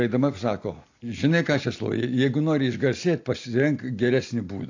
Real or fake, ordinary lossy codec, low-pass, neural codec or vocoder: real; AAC, 48 kbps; 7.2 kHz; none